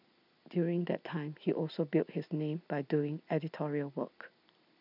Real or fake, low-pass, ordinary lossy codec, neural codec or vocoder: real; 5.4 kHz; none; none